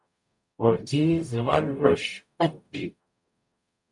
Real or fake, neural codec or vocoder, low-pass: fake; codec, 44.1 kHz, 0.9 kbps, DAC; 10.8 kHz